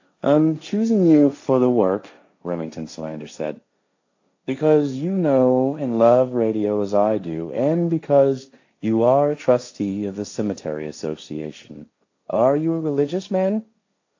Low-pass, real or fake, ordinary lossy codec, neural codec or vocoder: 7.2 kHz; fake; AAC, 48 kbps; codec, 16 kHz, 1.1 kbps, Voila-Tokenizer